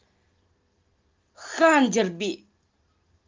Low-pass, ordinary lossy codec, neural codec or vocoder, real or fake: 7.2 kHz; Opus, 24 kbps; none; real